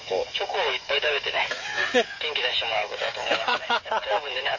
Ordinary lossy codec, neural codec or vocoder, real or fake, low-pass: MP3, 32 kbps; codec, 16 kHz, 8 kbps, FreqCodec, smaller model; fake; 7.2 kHz